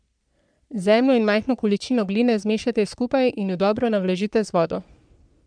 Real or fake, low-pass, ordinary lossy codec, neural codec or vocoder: fake; 9.9 kHz; none; codec, 44.1 kHz, 3.4 kbps, Pupu-Codec